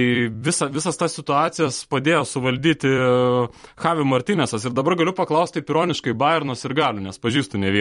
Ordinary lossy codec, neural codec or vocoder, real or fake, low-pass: MP3, 48 kbps; vocoder, 44.1 kHz, 128 mel bands every 256 samples, BigVGAN v2; fake; 19.8 kHz